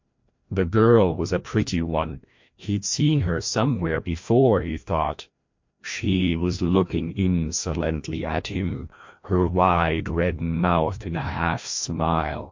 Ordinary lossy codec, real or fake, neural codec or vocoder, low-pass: MP3, 48 kbps; fake; codec, 16 kHz, 1 kbps, FreqCodec, larger model; 7.2 kHz